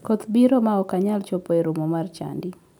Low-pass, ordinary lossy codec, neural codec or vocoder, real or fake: 19.8 kHz; none; none; real